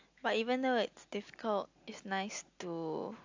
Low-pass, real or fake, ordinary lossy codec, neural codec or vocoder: 7.2 kHz; real; AAC, 48 kbps; none